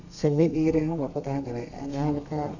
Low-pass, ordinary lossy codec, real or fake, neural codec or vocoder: 7.2 kHz; none; fake; codec, 32 kHz, 1.9 kbps, SNAC